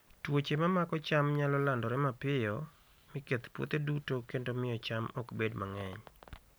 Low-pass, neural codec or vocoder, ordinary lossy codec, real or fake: none; none; none; real